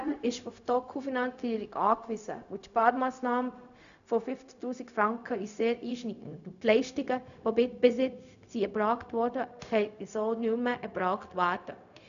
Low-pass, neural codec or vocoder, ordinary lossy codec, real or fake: 7.2 kHz; codec, 16 kHz, 0.4 kbps, LongCat-Audio-Codec; none; fake